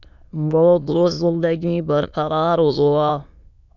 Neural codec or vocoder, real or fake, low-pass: autoencoder, 22.05 kHz, a latent of 192 numbers a frame, VITS, trained on many speakers; fake; 7.2 kHz